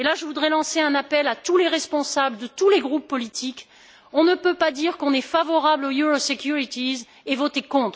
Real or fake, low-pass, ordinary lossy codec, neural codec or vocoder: real; none; none; none